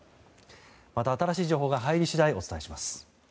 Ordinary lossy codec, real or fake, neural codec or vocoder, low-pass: none; real; none; none